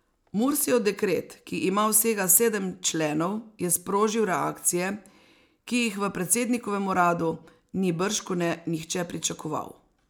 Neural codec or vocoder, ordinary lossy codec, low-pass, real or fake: none; none; none; real